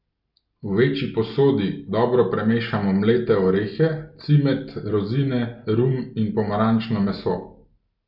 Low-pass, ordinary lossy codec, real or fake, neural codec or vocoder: 5.4 kHz; none; real; none